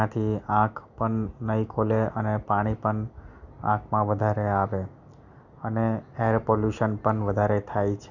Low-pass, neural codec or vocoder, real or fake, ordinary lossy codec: 7.2 kHz; none; real; none